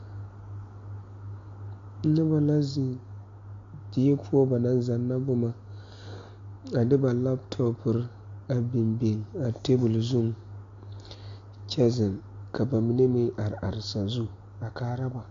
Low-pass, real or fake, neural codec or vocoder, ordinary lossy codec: 7.2 kHz; real; none; AAC, 48 kbps